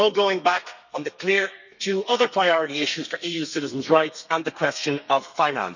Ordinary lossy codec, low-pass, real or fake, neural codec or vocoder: none; 7.2 kHz; fake; codec, 32 kHz, 1.9 kbps, SNAC